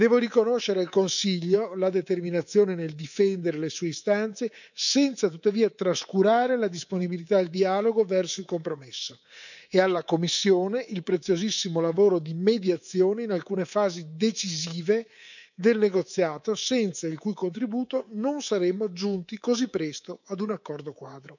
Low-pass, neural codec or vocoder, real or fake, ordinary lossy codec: 7.2 kHz; codec, 24 kHz, 3.1 kbps, DualCodec; fake; none